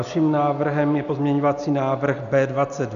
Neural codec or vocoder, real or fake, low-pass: none; real; 7.2 kHz